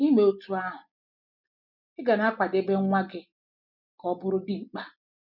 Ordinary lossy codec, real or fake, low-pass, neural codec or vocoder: none; real; 5.4 kHz; none